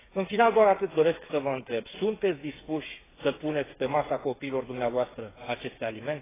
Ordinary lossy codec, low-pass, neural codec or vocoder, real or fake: AAC, 16 kbps; 3.6 kHz; codec, 16 kHz, 8 kbps, FreqCodec, smaller model; fake